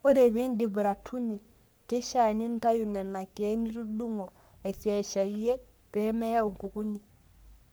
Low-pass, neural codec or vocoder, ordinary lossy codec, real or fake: none; codec, 44.1 kHz, 3.4 kbps, Pupu-Codec; none; fake